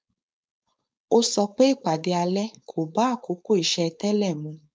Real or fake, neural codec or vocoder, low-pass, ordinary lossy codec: fake; codec, 16 kHz, 4.8 kbps, FACodec; none; none